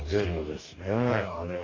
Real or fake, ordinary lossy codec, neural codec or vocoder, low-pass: fake; AAC, 48 kbps; codec, 44.1 kHz, 2.6 kbps, DAC; 7.2 kHz